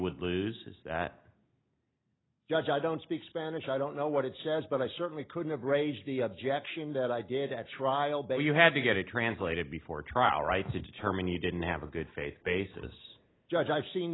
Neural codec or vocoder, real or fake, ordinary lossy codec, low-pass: none; real; AAC, 16 kbps; 7.2 kHz